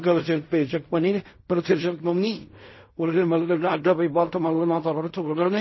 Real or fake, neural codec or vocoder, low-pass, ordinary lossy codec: fake; codec, 16 kHz in and 24 kHz out, 0.4 kbps, LongCat-Audio-Codec, fine tuned four codebook decoder; 7.2 kHz; MP3, 24 kbps